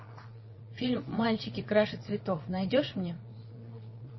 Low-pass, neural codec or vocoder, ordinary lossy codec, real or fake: 7.2 kHz; vocoder, 22.05 kHz, 80 mel bands, Vocos; MP3, 24 kbps; fake